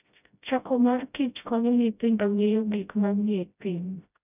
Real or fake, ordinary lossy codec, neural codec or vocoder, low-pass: fake; none; codec, 16 kHz, 0.5 kbps, FreqCodec, smaller model; 3.6 kHz